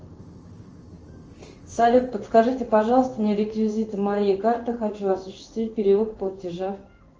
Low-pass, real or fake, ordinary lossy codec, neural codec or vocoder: 7.2 kHz; fake; Opus, 24 kbps; codec, 16 kHz in and 24 kHz out, 1 kbps, XY-Tokenizer